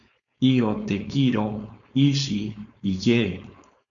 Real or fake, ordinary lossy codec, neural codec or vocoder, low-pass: fake; MP3, 96 kbps; codec, 16 kHz, 4.8 kbps, FACodec; 7.2 kHz